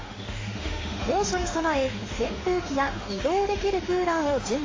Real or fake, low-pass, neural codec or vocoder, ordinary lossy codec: fake; 7.2 kHz; autoencoder, 48 kHz, 32 numbers a frame, DAC-VAE, trained on Japanese speech; AAC, 32 kbps